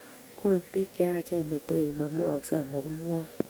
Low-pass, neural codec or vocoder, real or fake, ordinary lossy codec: none; codec, 44.1 kHz, 2.6 kbps, DAC; fake; none